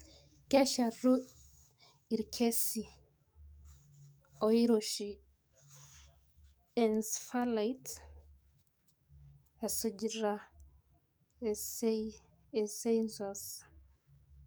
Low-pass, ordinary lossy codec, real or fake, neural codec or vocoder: none; none; fake; codec, 44.1 kHz, 7.8 kbps, DAC